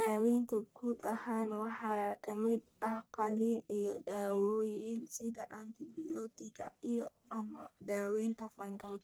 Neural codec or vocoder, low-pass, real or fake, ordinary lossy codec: codec, 44.1 kHz, 1.7 kbps, Pupu-Codec; none; fake; none